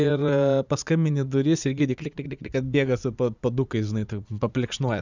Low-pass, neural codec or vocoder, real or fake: 7.2 kHz; vocoder, 44.1 kHz, 80 mel bands, Vocos; fake